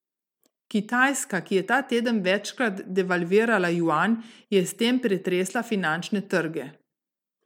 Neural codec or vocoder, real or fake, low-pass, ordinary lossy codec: none; real; 19.8 kHz; MP3, 96 kbps